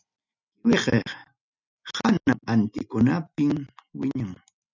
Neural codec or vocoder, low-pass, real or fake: none; 7.2 kHz; real